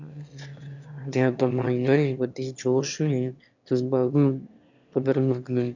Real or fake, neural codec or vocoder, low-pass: fake; autoencoder, 22.05 kHz, a latent of 192 numbers a frame, VITS, trained on one speaker; 7.2 kHz